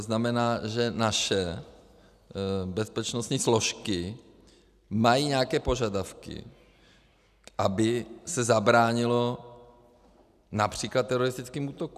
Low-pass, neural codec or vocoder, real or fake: 14.4 kHz; none; real